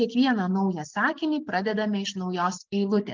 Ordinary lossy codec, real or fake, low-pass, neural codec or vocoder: Opus, 24 kbps; real; 7.2 kHz; none